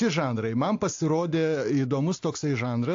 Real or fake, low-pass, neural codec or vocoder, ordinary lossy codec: real; 7.2 kHz; none; AAC, 48 kbps